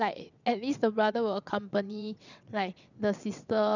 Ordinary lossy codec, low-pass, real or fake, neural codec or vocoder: none; 7.2 kHz; fake; vocoder, 22.05 kHz, 80 mel bands, WaveNeXt